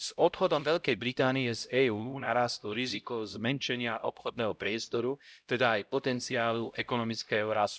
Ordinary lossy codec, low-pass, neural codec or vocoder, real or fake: none; none; codec, 16 kHz, 0.5 kbps, X-Codec, HuBERT features, trained on LibriSpeech; fake